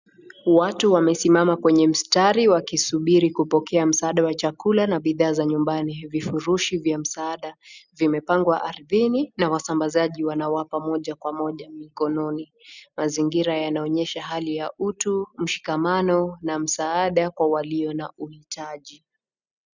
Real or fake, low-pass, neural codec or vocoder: real; 7.2 kHz; none